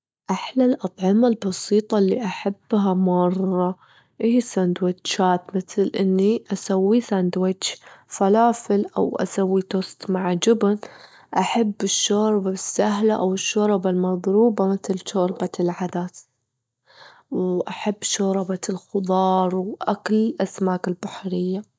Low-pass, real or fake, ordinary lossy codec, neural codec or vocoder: none; real; none; none